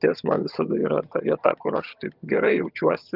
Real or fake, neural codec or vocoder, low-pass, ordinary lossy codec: fake; vocoder, 22.05 kHz, 80 mel bands, HiFi-GAN; 5.4 kHz; Opus, 24 kbps